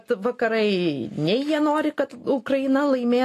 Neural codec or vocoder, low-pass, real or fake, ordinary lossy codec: none; 14.4 kHz; real; AAC, 48 kbps